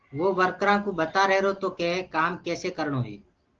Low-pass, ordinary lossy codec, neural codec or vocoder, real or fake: 7.2 kHz; Opus, 16 kbps; none; real